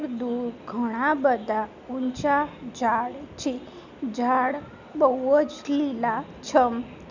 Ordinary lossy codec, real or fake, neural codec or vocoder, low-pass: none; real; none; 7.2 kHz